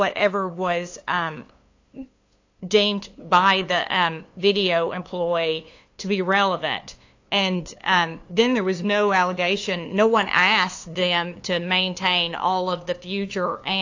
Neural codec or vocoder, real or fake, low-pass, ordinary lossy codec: codec, 16 kHz, 2 kbps, FunCodec, trained on LibriTTS, 25 frames a second; fake; 7.2 kHz; AAC, 48 kbps